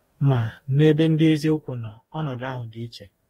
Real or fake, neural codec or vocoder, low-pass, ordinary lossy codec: fake; codec, 44.1 kHz, 2.6 kbps, DAC; 19.8 kHz; AAC, 48 kbps